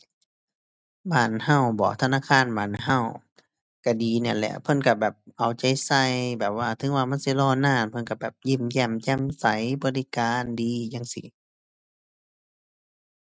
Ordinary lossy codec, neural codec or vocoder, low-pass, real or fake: none; none; none; real